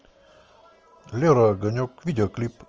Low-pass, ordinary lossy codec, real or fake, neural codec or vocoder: 7.2 kHz; Opus, 24 kbps; real; none